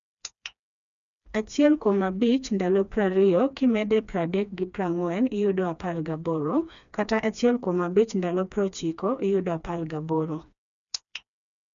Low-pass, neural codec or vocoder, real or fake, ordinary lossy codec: 7.2 kHz; codec, 16 kHz, 2 kbps, FreqCodec, smaller model; fake; none